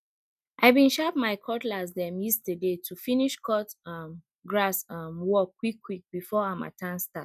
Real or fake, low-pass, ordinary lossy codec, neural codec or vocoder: real; 14.4 kHz; none; none